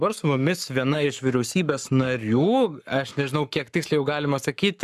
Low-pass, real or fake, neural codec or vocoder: 14.4 kHz; fake; codec, 44.1 kHz, 7.8 kbps, Pupu-Codec